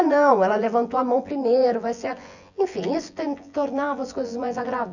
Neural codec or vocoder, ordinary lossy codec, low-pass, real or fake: vocoder, 24 kHz, 100 mel bands, Vocos; none; 7.2 kHz; fake